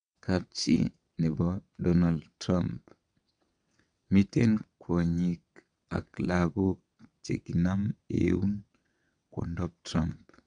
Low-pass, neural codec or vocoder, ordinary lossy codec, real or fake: 9.9 kHz; vocoder, 22.05 kHz, 80 mel bands, WaveNeXt; none; fake